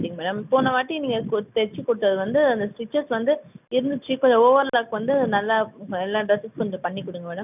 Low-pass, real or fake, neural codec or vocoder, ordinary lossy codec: 3.6 kHz; real; none; none